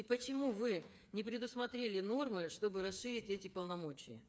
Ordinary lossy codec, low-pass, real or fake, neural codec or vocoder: none; none; fake; codec, 16 kHz, 4 kbps, FreqCodec, smaller model